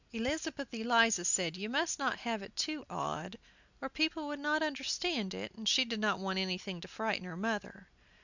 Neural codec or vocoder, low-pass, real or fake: none; 7.2 kHz; real